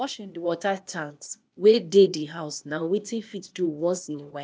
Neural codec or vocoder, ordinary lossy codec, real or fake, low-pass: codec, 16 kHz, 0.8 kbps, ZipCodec; none; fake; none